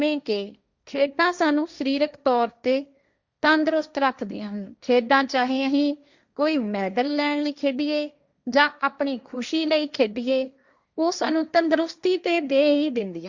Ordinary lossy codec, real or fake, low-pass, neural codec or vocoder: Opus, 64 kbps; fake; 7.2 kHz; codec, 16 kHz, 1.1 kbps, Voila-Tokenizer